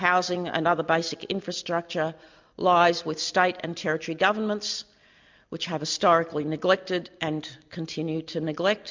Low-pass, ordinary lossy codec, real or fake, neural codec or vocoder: 7.2 kHz; MP3, 64 kbps; real; none